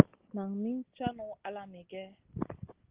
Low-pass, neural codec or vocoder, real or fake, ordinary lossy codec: 3.6 kHz; none; real; Opus, 16 kbps